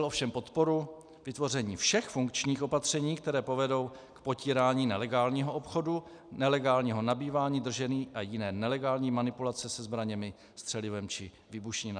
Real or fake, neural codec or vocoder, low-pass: real; none; 9.9 kHz